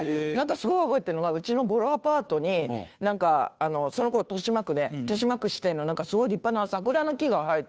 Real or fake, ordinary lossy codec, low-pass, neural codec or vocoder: fake; none; none; codec, 16 kHz, 2 kbps, FunCodec, trained on Chinese and English, 25 frames a second